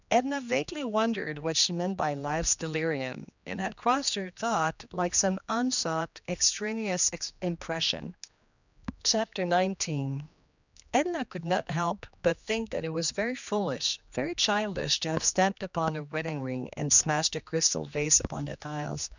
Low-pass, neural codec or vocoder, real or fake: 7.2 kHz; codec, 16 kHz, 2 kbps, X-Codec, HuBERT features, trained on general audio; fake